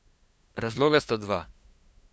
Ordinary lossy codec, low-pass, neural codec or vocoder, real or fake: none; none; codec, 16 kHz, 2 kbps, FunCodec, trained on LibriTTS, 25 frames a second; fake